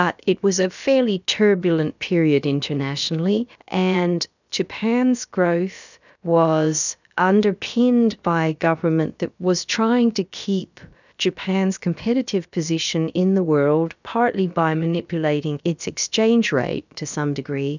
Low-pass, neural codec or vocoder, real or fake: 7.2 kHz; codec, 16 kHz, about 1 kbps, DyCAST, with the encoder's durations; fake